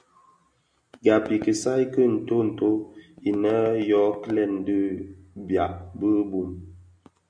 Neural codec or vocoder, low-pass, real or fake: none; 9.9 kHz; real